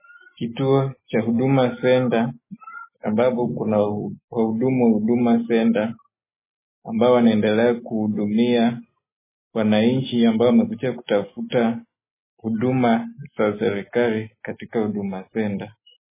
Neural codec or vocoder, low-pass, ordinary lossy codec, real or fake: none; 3.6 kHz; MP3, 16 kbps; real